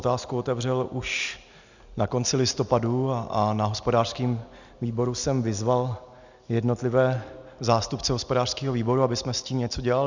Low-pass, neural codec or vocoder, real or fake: 7.2 kHz; none; real